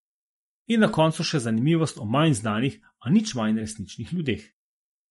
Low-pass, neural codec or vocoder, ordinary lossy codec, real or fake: 14.4 kHz; none; MP3, 48 kbps; real